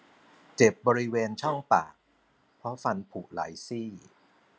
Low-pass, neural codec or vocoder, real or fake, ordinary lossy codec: none; none; real; none